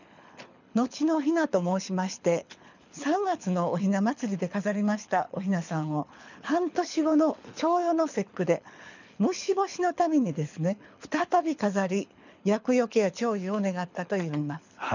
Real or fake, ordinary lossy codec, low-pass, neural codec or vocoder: fake; none; 7.2 kHz; codec, 24 kHz, 6 kbps, HILCodec